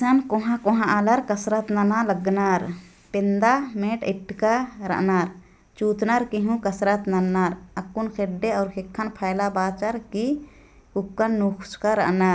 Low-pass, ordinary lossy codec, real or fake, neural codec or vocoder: none; none; real; none